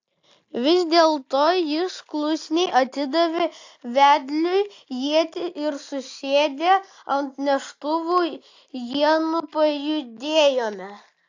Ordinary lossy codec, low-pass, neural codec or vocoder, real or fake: AAC, 48 kbps; 7.2 kHz; none; real